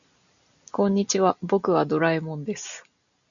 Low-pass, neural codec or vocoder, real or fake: 7.2 kHz; none; real